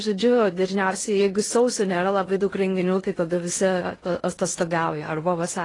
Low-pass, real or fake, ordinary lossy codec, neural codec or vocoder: 10.8 kHz; fake; AAC, 32 kbps; codec, 16 kHz in and 24 kHz out, 0.6 kbps, FocalCodec, streaming, 2048 codes